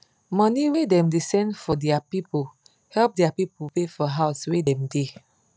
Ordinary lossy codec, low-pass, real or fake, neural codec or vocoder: none; none; real; none